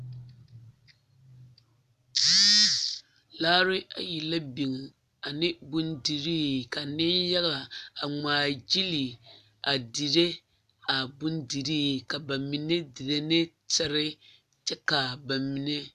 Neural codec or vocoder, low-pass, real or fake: none; 14.4 kHz; real